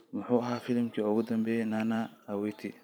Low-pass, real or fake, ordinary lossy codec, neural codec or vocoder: none; fake; none; vocoder, 44.1 kHz, 128 mel bands every 512 samples, BigVGAN v2